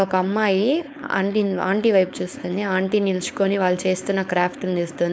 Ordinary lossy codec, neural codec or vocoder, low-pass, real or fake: none; codec, 16 kHz, 4.8 kbps, FACodec; none; fake